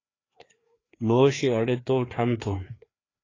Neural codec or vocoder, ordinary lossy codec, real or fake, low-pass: codec, 16 kHz, 2 kbps, FreqCodec, larger model; AAC, 32 kbps; fake; 7.2 kHz